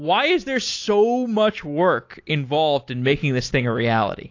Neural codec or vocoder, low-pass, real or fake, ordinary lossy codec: none; 7.2 kHz; real; AAC, 48 kbps